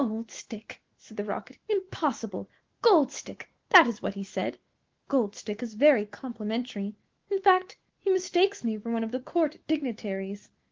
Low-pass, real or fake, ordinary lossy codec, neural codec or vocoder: 7.2 kHz; fake; Opus, 16 kbps; autoencoder, 48 kHz, 128 numbers a frame, DAC-VAE, trained on Japanese speech